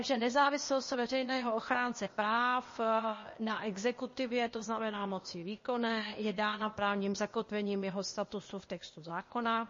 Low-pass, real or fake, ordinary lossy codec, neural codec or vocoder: 7.2 kHz; fake; MP3, 32 kbps; codec, 16 kHz, 0.8 kbps, ZipCodec